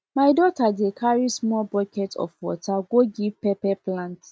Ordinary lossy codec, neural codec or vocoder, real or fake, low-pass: none; none; real; none